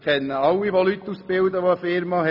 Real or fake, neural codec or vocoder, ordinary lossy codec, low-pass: real; none; none; 5.4 kHz